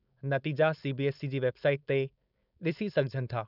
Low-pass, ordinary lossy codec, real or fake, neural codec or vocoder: 5.4 kHz; none; fake; codec, 16 kHz, 4.8 kbps, FACodec